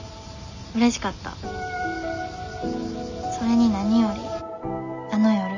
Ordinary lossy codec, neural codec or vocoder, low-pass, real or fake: none; none; 7.2 kHz; real